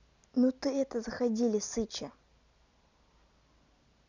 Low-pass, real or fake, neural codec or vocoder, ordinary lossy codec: 7.2 kHz; real; none; none